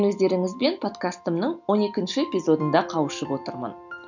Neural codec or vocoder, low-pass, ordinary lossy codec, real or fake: none; 7.2 kHz; none; real